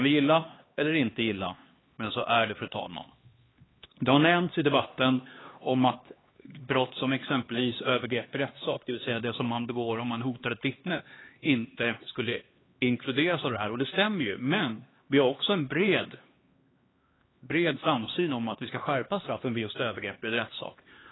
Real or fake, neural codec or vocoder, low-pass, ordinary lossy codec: fake; codec, 16 kHz, 2 kbps, X-Codec, HuBERT features, trained on LibriSpeech; 7.2 kHz; AAC, 16 kbps